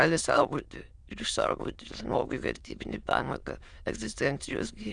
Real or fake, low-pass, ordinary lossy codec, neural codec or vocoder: fake; 9.9 kHz; Opus, 64 kbps; autoencoder, 22.05 kHz, a latent of 192 numbers a frame, VITS, trained on many speakers